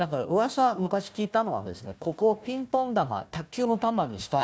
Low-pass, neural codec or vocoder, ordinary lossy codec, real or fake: none; codec, 16 kHz, 1 kbps, FunCodec, trained on Chinese and English, 50 frames a second; none; fake